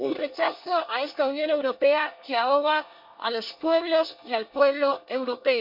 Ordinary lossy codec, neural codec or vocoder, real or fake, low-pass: MP3, 48 kbps; codec, 24 kHz, 1 kbps, SNAC; fake; 5.4 kHz